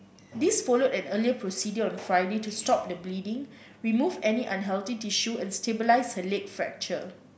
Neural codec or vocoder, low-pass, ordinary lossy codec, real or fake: none; none; none; real